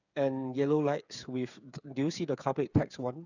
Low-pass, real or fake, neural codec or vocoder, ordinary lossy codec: 7.2 kHz; fake; codec, 16 kHz, 8 kbps, FreqCodec, smaller model; none